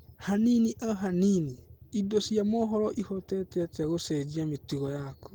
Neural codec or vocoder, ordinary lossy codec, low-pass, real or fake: none; Opus, 16 kbps; 19.8 kHz; real